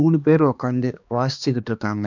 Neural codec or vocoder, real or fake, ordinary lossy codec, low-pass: codec, 16 kHz, 2 kbps, X-Codec, HuBERT features, trained on balanced general audio; fake; none; 7.2 kHz